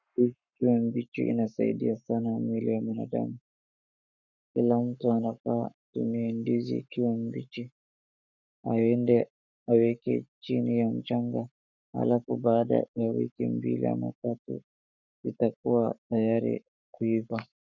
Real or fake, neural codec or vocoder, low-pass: fake; codec, 44.1 kHz, 7.8 kbps, Pupu-Codec; 7.2 kHz